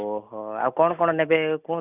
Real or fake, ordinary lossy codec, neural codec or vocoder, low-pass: real; none; none; 3.6 kHz